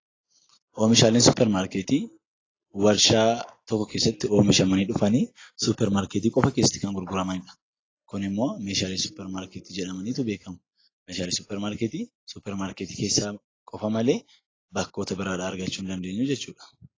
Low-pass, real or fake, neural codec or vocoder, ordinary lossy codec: 7.2 kHz; real; none; AAC, 32 kbps